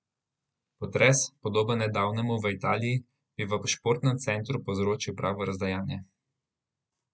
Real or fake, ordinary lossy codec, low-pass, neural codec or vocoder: real; none; none; none